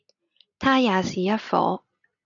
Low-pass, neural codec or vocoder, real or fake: 7.2 kHz; none; real